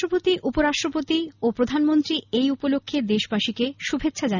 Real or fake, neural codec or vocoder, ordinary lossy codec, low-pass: real; none; none; 7.2 kHz